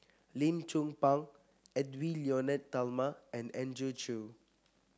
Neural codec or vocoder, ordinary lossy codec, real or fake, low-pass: none; none; real; none